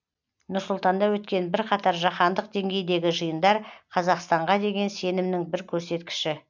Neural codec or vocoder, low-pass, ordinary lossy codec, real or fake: none; 7.2 kHz; none; real